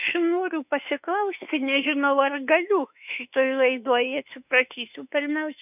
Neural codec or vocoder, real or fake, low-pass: autoencoder, 48 kHz, 32 numbers a frame, DAC-VAE, trained on Japanese speech; fake; 3.6 kHz